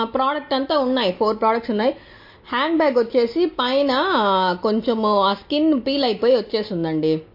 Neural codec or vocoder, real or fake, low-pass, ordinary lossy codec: none; real; 5.4 kHz; MP3, 32 kbps